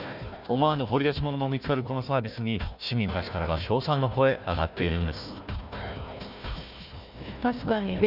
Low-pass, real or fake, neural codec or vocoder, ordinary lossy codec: 5.4 kHz; fake; codec, 16 kHz, 1 kbps, FunCodec, trained on Chinese and English, 50 frames a second; none